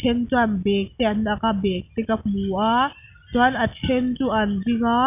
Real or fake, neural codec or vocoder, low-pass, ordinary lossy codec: real; none; 3.6 kHz; none